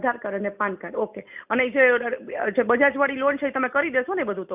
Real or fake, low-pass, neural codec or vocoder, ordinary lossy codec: real; 3.6 kHz; none; none